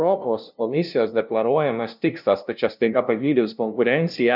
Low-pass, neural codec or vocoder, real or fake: 5.4 kHz; codec, 16 kHz, 0.5 kbps, FunCodec, trained on LibriTTS, 25 frames a second; fake